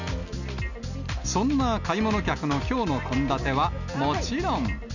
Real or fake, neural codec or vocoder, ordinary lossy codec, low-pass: real; none; none; 7.2 kHz